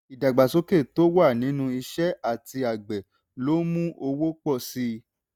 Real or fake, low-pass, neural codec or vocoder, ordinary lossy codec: real; none; none; none